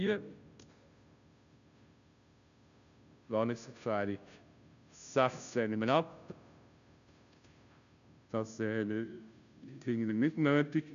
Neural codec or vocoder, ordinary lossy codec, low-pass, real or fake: codec, 16 kHz, 0.5 kbps, FunCodec, trained on Chinese and English, 25 frames a second; none; 7.2 kHz; fake